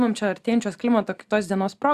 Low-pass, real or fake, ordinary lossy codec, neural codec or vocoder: 14.4 kHz; real; Opus, 64 kbps; none